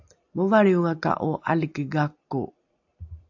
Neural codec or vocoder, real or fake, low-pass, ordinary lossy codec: none; real; 7.2 kHz; AAC, 48 kbps